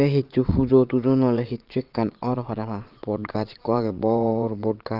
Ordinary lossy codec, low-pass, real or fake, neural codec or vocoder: Opus, 24 kbps; 5.4 kHz; fake; vocoder, 22.05 kHz, 80 mel bands, WaveNeXt